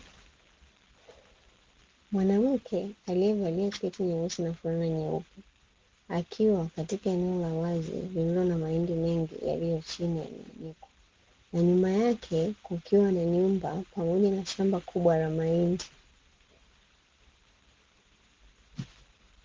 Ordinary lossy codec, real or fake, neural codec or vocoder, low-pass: Opus, 16 kbps; real; none; 7.2 kHz